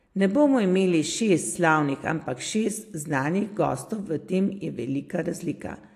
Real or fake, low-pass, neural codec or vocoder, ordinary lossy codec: real; 14.4 kHz; none; AAC, 64 kbps